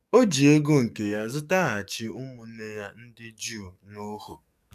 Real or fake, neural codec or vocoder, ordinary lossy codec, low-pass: fake; codec, 44.1 kHz, 7.8 kbps, DAC; none; 14.4 kHz